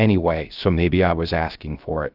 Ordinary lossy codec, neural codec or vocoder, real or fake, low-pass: Opus, 32 kbps; codec, 16 kHz, 0.3 kbps, FocalCodec; fake; 5.4 kHz